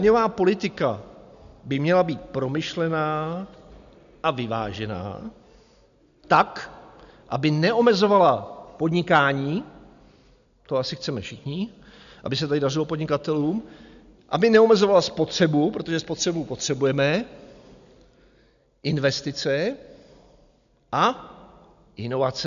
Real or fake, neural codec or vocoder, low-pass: real; none; 7.2 kHz